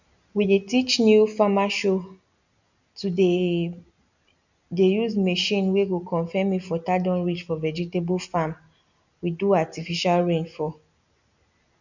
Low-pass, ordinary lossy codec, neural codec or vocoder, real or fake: 7.2 kHz; none; none; real